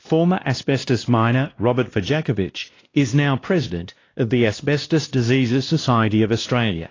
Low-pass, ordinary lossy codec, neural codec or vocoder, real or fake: 7.2 kHz; AAC, 32 kbps; codec, 16 kHz, 1 kbps, X-Codec, WavLM features, trained on Multilingual LibriSpeech; fake